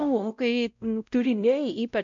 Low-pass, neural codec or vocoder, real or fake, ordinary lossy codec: 7.2 kHz; codec, 16 kHz, 0.5 kbps, X-Codec, WavLM features, trained on Multilingual LibriSpeech; fake; MP3, 64 kbps